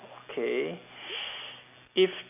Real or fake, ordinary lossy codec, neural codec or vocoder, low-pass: real; none; none; 3.6 kHz